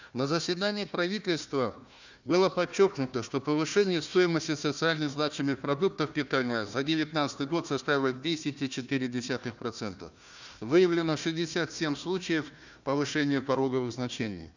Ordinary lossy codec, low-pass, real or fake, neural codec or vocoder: none; 7.2 kHz; fake; codec, 16 kHz, 1 kbps, FunCodec, trained on Chinese and English, 50 frames a second